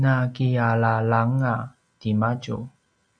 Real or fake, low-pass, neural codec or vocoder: real; 9.9 kHz; none